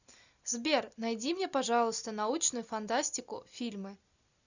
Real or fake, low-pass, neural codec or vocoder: real; 7.2 kHz; none